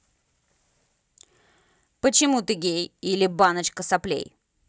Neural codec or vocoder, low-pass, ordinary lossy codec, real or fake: none; none; none; real